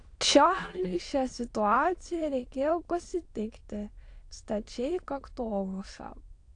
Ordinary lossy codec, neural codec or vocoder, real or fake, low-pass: AAC, 48 kbps; autoencoder, 22.05 kHz, a latent of 192 numbers a frame, VITS, trained on many speakers; fake; 9.9 kHz